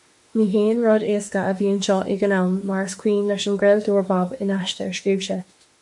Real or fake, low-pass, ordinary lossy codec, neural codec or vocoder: fake; 10.8 kHz; MP3, 64 kbps; autoencoder, 48 kHz, 32 numbers a frame, DAC-VAE, trained on Japanese speech